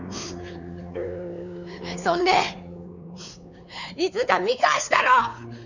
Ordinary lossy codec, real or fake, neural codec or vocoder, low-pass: none; fake; codec, 16 kHz, 4 kbps, X-Codec, WavLM features, trained on Multilingual LibriSpeech; 7.2 kHz